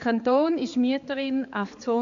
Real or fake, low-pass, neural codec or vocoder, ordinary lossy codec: fake; 7.2 kHz; codec, 16 kHz, 4 kbps, X-Codec, HuBERT features, trained on balanced general audio; AAC, 64 kbps